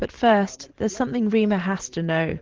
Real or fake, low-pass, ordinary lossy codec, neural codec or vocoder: fake; 7.2 kHz; Opus, 16 kbps; vocoder, 44.1 kHz, 128 mel bands every 512 samples, BigVGAN v2